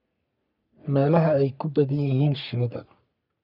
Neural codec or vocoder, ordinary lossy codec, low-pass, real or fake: codec, 44.1 kHz, 3.4 kbps, Pupu-Codec; none; 5.4 kHz; fake